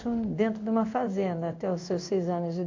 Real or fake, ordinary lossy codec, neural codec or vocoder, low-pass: fake; AAC, 48 kbps; codec, 16 kHz in and 24 kHz out, 1 kbps, XY-Tokenizer; 7.2 kHz